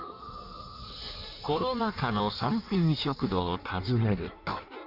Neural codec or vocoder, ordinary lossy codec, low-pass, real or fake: codec, 16 kHz in and 24 kHz out, 1.1 kbps, FireRedTTS-2 codec; none; 5.4 kHz; fake